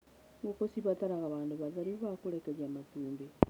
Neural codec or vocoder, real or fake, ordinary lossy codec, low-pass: none; real; none; none